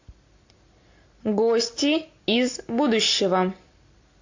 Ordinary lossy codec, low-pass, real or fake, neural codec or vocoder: AAC, 48 kbps; 7.2 kHz; real; none